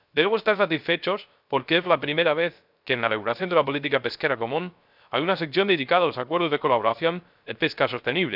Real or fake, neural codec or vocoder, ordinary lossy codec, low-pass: fake; codec, 16 kHz, 0.3 kbps, FocalCodec; none; 5.4 kHz